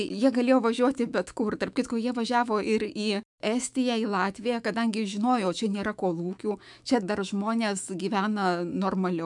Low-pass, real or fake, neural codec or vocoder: 10.8 kHz; fake; autoencoder, 48 kHz, 128 numbers a frame, DAC-VAE, trained on Japanese speech